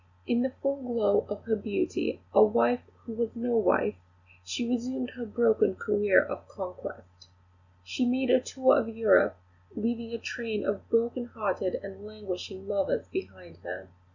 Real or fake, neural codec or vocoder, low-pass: real; none; 7.2 kHz